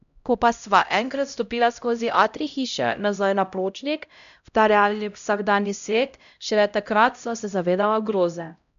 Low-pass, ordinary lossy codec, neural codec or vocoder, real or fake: 7.2 kHz; none; codec, 16 kHz, 0.5 kbps, X-Codec, HuBERT features, trained on LibriSpeech; fake